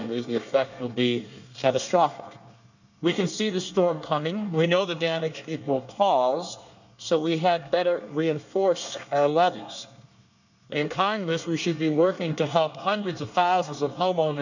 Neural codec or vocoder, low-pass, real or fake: codec, 24 kHz, 1 kbps, SNAC; 7.2 kHz; fake